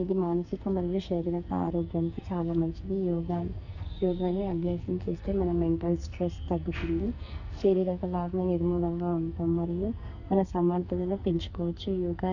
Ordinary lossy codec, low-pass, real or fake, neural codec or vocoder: none; 7.2 kHz; fake; codec, 44.1 kHz, 2.6 kbps, SNAC